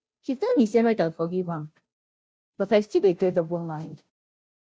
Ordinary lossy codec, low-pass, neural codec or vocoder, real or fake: none; none; codec, 16 kHz, 0.5 kbps, FunCodec, trained on Chinese and English, 25 frames a second; fake